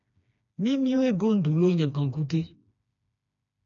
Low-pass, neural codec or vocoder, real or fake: 7.2 kHz; codec, 16 kHz, 2 kbps, FreqCodec, smaller model; fake